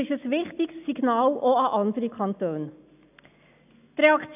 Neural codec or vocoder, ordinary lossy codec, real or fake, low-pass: none; AAC, 32 kbps; real; 3.6 kHz